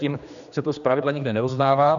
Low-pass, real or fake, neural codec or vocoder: 7.2 kHz; fake; codec, 16 kHz, 2 kbps, X-Codec, HuBERT features, trained on general audio